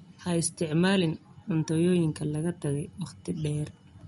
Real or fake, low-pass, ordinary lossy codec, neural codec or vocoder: real; 19.8 kHz; MP3, 48 kbps; none